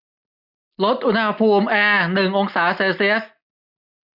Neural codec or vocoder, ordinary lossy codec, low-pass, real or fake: none; none; 5.4 kHz; real